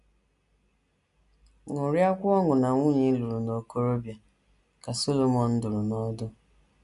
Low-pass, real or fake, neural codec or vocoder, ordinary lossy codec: 10.8 kHz; real; none; none